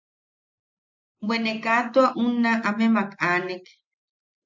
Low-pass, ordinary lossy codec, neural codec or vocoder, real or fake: 7.2 kHz; MP3, 64 kbps; none; real